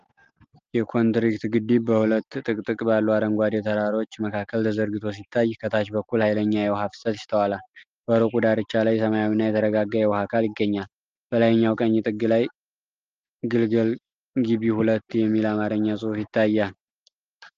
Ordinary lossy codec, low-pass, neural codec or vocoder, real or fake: Opus, 24 kbps; 7.2 kHz; none; real